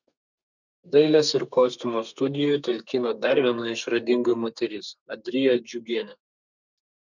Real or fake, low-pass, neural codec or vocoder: fake; 7.2 kHz; codec, 32 kHz, 1.9 kbps, SNAC